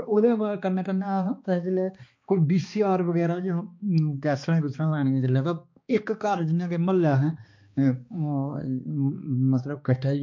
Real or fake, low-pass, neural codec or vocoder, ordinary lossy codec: fake; 7.2 kHz; codec, 16 kHz, 2 kbps, X-Codec, HuBERT features, trained on balanced general audio; MP3, 48 kbps